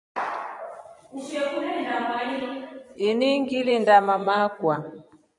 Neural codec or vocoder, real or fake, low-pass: vocoder, 24 kHz, 100 mel bands, Vocos; fake; 10.8 kHz